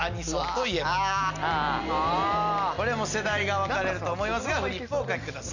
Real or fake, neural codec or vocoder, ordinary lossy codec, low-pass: real; none; none; 7.2 kHz